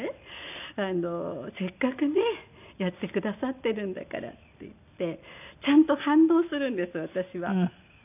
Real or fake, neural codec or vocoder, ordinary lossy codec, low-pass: real; none; none; 3.6 kHz